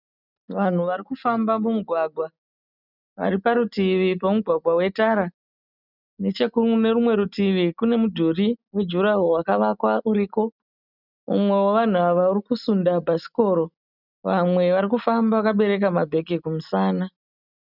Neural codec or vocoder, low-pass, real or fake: none; 5.4 kHz; real